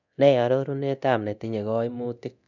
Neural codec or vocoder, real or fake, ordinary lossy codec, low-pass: codec, 24 kHz, 0.9 kbps, DualCodec; fake; none; 7.2 kHz